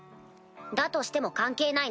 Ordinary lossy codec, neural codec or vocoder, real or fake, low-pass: none; none; real; none